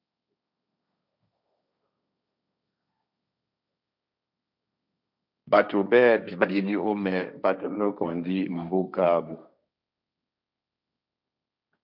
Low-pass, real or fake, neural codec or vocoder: 5.4 kHz; fake; codec, 16 kHz, 1.1 kbps, Voila-Tokenizer